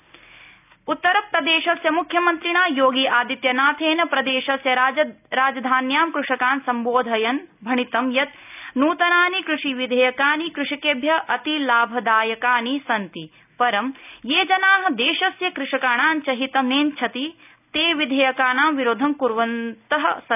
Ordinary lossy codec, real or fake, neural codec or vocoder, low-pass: none; real; none; 3.6 kHz